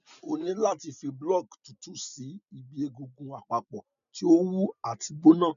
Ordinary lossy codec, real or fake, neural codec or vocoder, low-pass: none; real; none; 7.2 kHz